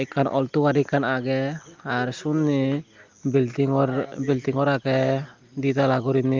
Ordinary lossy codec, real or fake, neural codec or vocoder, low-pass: Opus, 32 kbps; real; none; 7.2 kHz